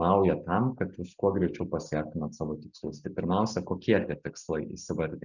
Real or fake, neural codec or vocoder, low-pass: real; none; 7.2 kHz